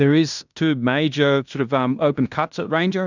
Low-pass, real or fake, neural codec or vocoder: 7.2 kHz; fake; codec, 16 kHz in and 24 kHz out, 0.9 kbps, LongCat-Audio-Codec, fine tuned four codebook decoder